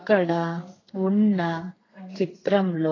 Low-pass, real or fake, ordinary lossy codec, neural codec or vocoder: 7.2 kHz; fake; AAC, 32 kbps; codec, 32 kHz, 1.9 kbps, SNAC